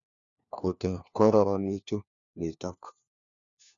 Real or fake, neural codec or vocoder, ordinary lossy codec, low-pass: fake; codec, 16 kHz, 1 kbps, FunCodec, trained on LibriTTS, 50 frames a second; none; 7.2 kHz